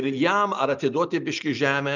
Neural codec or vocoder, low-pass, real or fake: none; 7.2 kHz; real